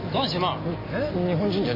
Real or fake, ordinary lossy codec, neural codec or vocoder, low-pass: real; MP3, 24 kbps; none; 5.4 kHz